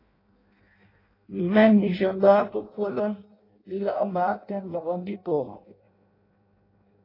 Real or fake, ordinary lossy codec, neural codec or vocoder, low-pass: fake; AAC, 24 kbps; codec, 16 kHz in and 24 kHz out, 0.6 kbps, FireRedTTS-2 codec; 5.4 kHz